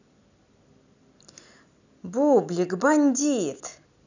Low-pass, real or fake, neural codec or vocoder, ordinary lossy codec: 7.2 kHz; fake; vocoder, 44.1 kHz, 128 mel bands every 256 samples, BigVGAN v2; none